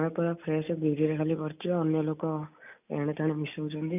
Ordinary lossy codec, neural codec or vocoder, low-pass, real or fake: none; none; 3.6 kHz; real